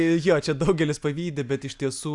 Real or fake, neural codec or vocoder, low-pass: real; none; 10.8 kHz